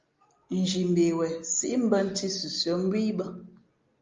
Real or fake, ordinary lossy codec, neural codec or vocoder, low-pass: real; Opus, 32 kbps; none; 7.2 kHz